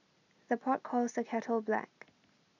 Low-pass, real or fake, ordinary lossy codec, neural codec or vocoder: 7.2 kHz; real; none; none